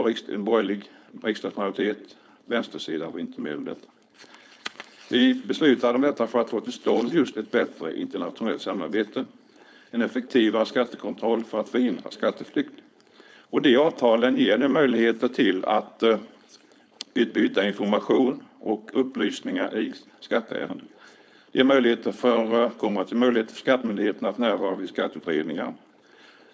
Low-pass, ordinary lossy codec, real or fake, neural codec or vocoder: none; none; fake; codec, 16 kHz, 4.8 kbps, FACodec